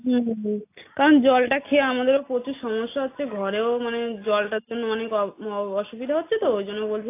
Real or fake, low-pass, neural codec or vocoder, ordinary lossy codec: real; 3.6 kHz; none; AAC, 24 kbps